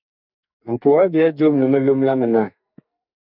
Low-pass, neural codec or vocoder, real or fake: 5.4 kHz; codec, 44.1 kHz, 2.6 kbps, SNAC; fake